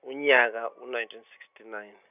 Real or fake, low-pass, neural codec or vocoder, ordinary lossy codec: real; 3.6 kHz; none; none